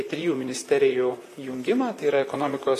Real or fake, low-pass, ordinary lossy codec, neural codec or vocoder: fake; 14.4 kHz; AAC, 48 kbps; vocoder, 44.1 kHz, 128 mel bands, Pupu-Vocoder